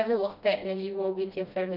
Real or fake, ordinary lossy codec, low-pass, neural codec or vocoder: fake; none; 5.4 kHz; codec, 16 kHz, 1 kbps, FreqCodec, smaller model